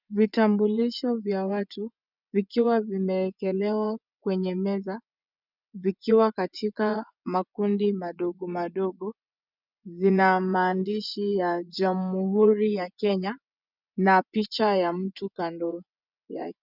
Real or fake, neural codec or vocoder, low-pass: fake; vocoder, 24 kHz, 100 mel bands, Vocos; 5.4 kHz